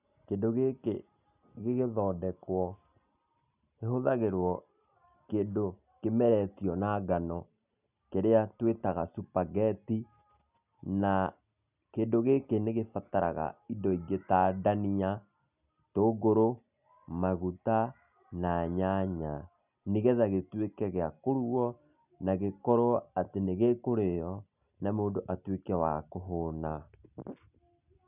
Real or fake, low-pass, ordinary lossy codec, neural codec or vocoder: real; 3.6 kHz; none; none